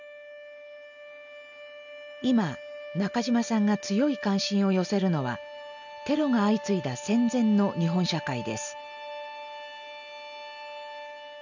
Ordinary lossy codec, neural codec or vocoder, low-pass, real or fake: none; none; 7.2 kHz; real